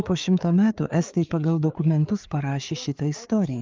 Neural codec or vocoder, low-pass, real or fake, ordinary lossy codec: codec, 16 kHz, 4 kbps, FreqCodec, larger model; 7.2 kHz; fake; Opus, 32 kbps